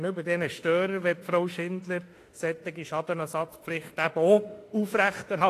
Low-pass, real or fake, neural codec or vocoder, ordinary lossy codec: 14.4 kHz; fake; autoencoder, 48 kHz, 32 numbers a frame, DAC-VAE, trained on Japanese speech; AAC, 48 kbps